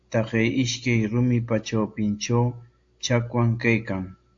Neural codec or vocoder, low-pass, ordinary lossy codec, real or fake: none; 7.2 kHz; AAC, 48 kbps; real